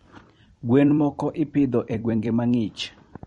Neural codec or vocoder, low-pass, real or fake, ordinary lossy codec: vocoder, 44.1 kHz, 128 mel bands every 256 samples, BigVGAN v2; 19.8 kHz; fake; MP3, 48 kbps